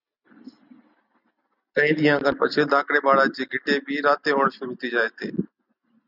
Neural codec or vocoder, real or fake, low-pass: none; real; 5.4 kHz